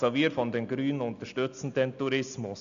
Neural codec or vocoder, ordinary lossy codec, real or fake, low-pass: none; AAC, 96 kbps; real; 7.2 kHz